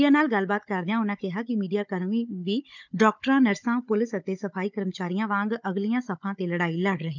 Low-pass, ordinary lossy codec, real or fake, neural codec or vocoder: 7.2 kHz; none; fake; codec, 16 kHz, 16 kbps, FunCodec, trained on Chinese and English, 50 frames a second